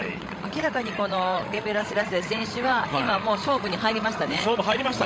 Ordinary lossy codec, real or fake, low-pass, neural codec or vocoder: none; fake; none; codec, 16 kHz, 16 kbps, FreqCodec, larger model